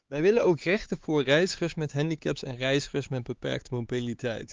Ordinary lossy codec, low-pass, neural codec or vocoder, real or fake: Opus, 32 kbps; 7.2 kHz; codec, 16 kHz, 4 kbps, X-Codec, HuBERT features, trained on LibriSpeech; fake